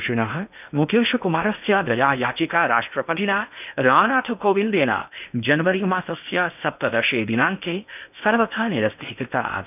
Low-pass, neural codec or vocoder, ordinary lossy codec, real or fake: 3.6 kHz; codec, 16 kHz in and 24 kHz out, 0.8 kbps, FocalCodec, streaming, 65536 codes; none; fake